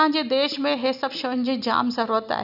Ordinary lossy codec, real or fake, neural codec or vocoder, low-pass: none; real; none; 5.4 kHz